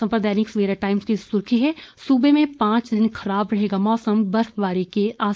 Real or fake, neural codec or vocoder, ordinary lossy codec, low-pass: fake; codec, 16 kHz, 4.8 kbps, FACodec; none; none